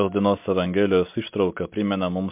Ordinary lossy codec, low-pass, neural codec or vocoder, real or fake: MP3, 32 kbps; 3.6 kHz; none; real